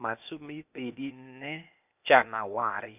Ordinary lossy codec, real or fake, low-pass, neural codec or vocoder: none; fake; 3.6 kHz; codec, 16 kHz, 0.8 kbps, ZipCodec